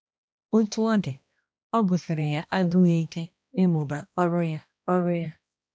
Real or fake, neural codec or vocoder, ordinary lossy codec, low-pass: fake; codec, 16 kHz, 1 kbps, X-Codec, HuBERT features, trained on balanced general audio; none; none